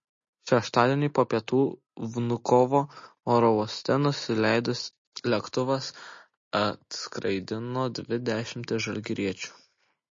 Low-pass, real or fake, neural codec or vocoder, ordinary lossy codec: 7.2 kHz; real; none; MP3, 32 kbps